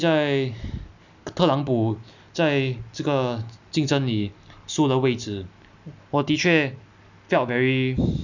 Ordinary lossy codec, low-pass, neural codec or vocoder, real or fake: none; 7.2 kHz; none; real